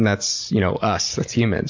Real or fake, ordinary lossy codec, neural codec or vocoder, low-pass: real; MP3, 48 kbps; none; 7.2 kHz